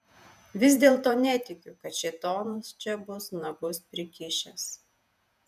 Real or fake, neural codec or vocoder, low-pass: fake; vocoder, 48 kHz, 128 mel bands, Vocos; 14.4 kHz